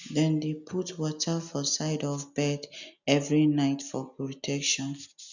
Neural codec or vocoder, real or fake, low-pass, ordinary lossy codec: none; real; 7.2 kHz; none